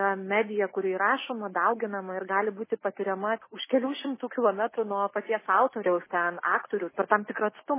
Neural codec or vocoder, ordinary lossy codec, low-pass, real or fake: none; MP3, 16 kbps; 3.6 kHz; real